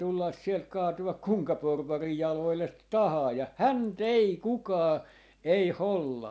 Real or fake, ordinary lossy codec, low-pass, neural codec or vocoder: real; none; none; none